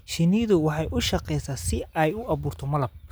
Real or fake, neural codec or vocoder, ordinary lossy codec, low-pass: real; none; none; none